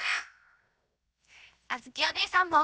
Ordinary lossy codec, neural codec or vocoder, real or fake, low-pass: none; codec, 16 kHz, 0.7 kbps, FocalCodec; fake; none